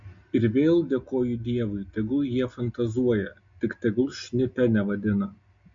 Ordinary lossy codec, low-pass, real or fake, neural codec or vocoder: MP3, 48 kbps; 7.2 kHz; real; none